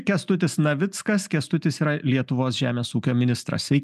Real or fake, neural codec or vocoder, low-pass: real; none; 14.4 kHz